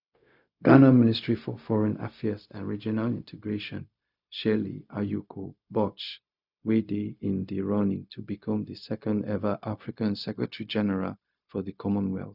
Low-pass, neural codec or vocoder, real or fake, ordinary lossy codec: 5.4 kHz; codec, 16 kHz, 0.4 kbps, LongCat-Audio-Codec; fake; none